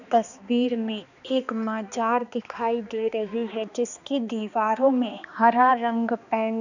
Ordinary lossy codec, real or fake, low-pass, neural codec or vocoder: none; fake; 7.2 kHz; codec, 16 kHz, 2 kbps, X-Codec, HuBERT features, trained on balanced general audio